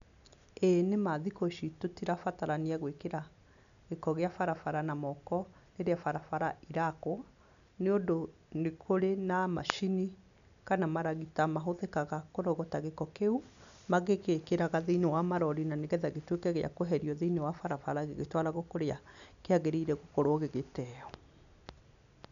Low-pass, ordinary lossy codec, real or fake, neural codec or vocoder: 7.2 kHz; none; real; none